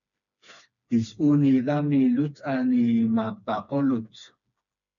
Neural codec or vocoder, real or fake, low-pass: codec, 16 kHz, 2 kbps, FreqCodec, smaller model; fake; 7.2 kHz